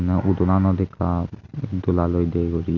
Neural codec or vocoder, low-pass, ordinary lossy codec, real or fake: none; 7.2 kHz; none; real